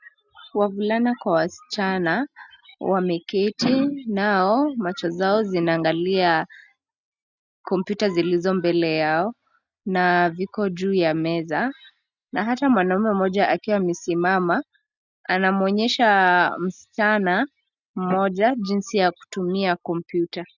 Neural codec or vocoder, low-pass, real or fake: none; 7.2 kHz; real